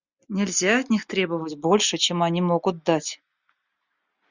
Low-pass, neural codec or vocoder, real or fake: 7.2 kHz; none; real